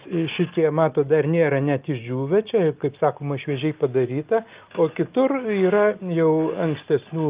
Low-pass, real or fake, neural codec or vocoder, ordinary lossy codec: 3.6 kHz; real; none; Opus, 32 kbps